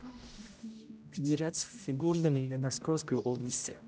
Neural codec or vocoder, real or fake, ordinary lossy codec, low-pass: codec, 16 kHz, 0.5 kbps, X-Codec, HuBERT features, trained on general audio; fake; none; none